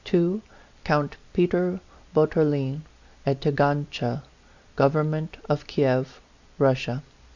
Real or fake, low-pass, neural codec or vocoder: real; 7.2 kHz; none